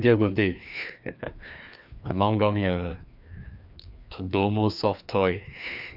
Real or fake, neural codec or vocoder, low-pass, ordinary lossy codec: fake; codec, 16 kHz, 2 kbps, FreqCodec, larger model; 5.4 kHz; none